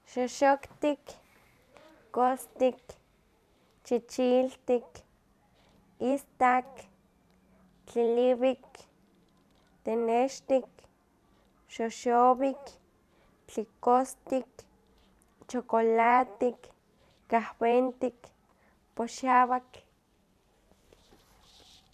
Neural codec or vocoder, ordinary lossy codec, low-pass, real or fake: vocoder, 44.1 kHz, 128 mel bands every 512 samples, BigVGAN v2; none; 14.4 kHz; fake